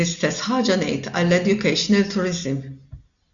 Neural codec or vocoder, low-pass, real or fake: none; 7.2 kHz; real